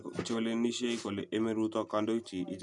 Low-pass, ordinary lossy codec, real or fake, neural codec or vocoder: 10.8 kHz; none; real; none